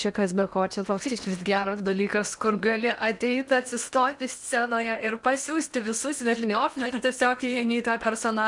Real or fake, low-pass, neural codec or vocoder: fake; 10.8 kHz; codec, 16 kHz in and 24 kHz out, 0.8 kbps, FocalCodec, streaming, 65536 codes